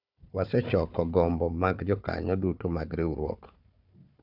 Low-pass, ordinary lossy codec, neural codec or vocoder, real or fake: 5.4 kHz; none; codec, 16 kHz, 4 kbps, FunCodec, trained on Chinese and English, 50 frames a second; fake